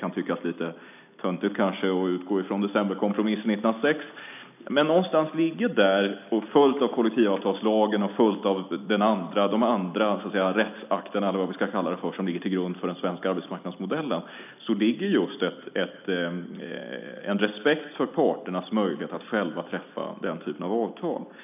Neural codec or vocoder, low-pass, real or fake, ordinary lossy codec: none; 3.6 kHz; real; none